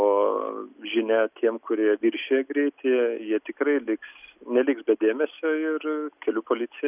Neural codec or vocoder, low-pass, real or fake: none; 3.6 kHz; real